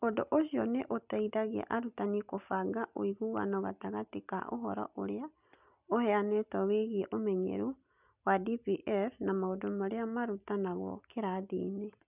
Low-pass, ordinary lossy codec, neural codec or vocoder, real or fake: 3.6 kHz; none; none; real